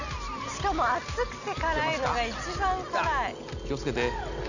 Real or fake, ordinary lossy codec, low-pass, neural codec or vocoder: real; none; 7.2 kHz; none